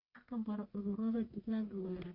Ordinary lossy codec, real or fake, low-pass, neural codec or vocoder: Opus, 64 kbps; fake; 5.4 kHz; codec, 44.1 kHz, 1.7 kbps, Pupu-Codec